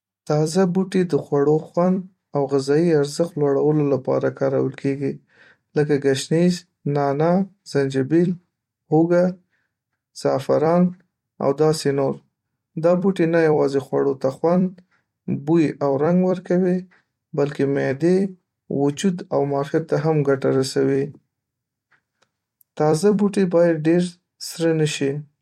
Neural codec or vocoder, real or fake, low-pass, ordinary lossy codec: vocoder, 44.1 kHz, 128 mel bands every 256 samples, BigVGAN v2; fake; 19.8 kHz; MP3, 64 kbps